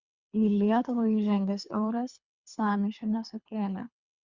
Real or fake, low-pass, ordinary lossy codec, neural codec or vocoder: fake; 7.2 kHz; Opus, 64 kbps; codec, 24 kHz, 3 kbps, HILCodec